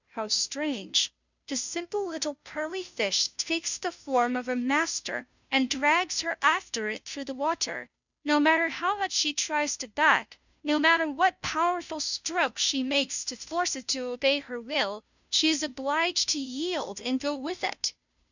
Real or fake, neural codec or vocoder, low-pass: fake; codec, 16 kHz, 0.5 kbps, FunCodec, trained on Chinese and English, 25 frames a second; 7.2 kHz